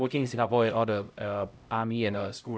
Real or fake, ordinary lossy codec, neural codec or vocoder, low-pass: fake; none; codec, 16 kHz, 0.5 kbps, X-Codec, HuBERT features, trained on LibriSpeech; none